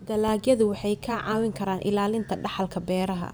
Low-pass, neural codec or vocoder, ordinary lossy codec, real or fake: none; vocoder, 44.1 kHz, 128 mel bands every 512 samples, BigVGAN v2; none; fake